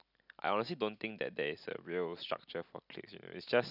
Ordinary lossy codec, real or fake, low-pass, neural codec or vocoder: none; real; 5.4 kHz; none